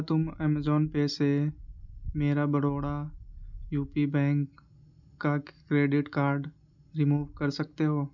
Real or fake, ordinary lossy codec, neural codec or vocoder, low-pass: real; none; none; 7.2 kHz